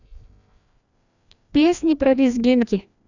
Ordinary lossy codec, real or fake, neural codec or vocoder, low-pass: none; fake; codec, 16 kHz, 1 kbps, FreqCodec, larger model; 7.2 kHz